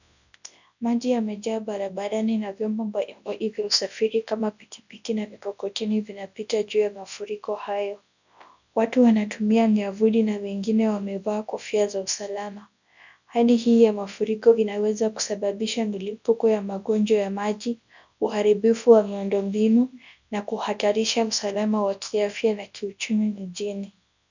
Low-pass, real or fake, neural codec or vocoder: 7.2 kHz; fake; codec, 24 kHz, 0.9 kbps, WavTokenizer, large speech release